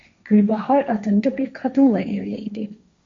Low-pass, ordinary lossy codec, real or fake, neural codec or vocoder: 7.2 kHz; AAC, 48 kbps; fake; codec, 16 kHz, 1.1 kbps, Voila-Tokenizer